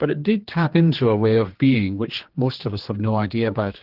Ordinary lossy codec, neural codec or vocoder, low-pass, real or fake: Opus, 16 kbps; codec, 16 kHz, 2 kbps, X-Codec, HuBERT features, trained on general audio; 5.4 kHz; fake